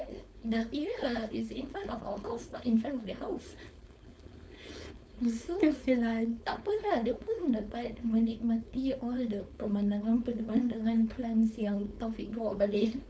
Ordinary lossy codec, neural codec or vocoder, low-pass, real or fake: none; codec, 16 kHz, 4.8 kbps, FACodec; none; fake